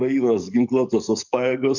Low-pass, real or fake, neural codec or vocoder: 7.2 kHz; fake; codec, 44.1 kHz, 7.8 kbps, DAC